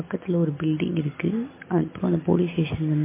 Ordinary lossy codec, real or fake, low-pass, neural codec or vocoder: MP3, 24 kbps; real; 3.6 kHz; none